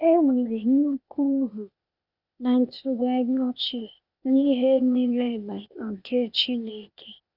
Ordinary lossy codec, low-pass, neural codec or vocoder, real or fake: none; 5.4 kHz; codec, 16 kHz, 0.8 kbps, ZipCodec; fake